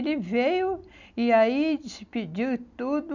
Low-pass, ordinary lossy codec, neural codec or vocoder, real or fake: 7.2 kHz; none; none; real